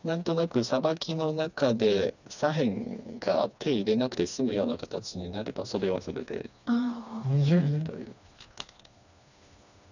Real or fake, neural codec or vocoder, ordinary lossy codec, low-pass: fake; codec, 16 kHz, 2 kbps, FreqCodec, smaller model; none; 7.2 kHz